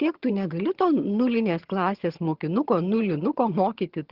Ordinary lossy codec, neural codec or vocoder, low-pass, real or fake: Opus, 16 kbps; vocoder, 22.05 kHz, 80 mel bands, HiFi-GAN; 5.4 kHz; fake